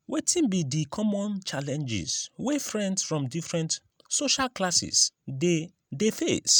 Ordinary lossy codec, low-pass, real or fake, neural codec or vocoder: none; none; real; none